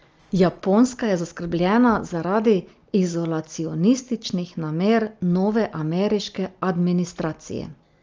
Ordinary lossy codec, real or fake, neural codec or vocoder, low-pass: Opus, 24 kbps; real; none; 7.2 kHz